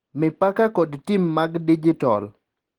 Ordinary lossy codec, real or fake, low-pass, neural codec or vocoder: Opus, 16 kbps; real; 19.8 kHz; none